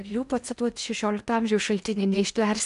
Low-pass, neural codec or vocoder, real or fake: 10.8 kHz; codec, 16 kHz in and 24 kHz out, 0.8 kbps, FocalCodec, streaming, 65536 codes; fake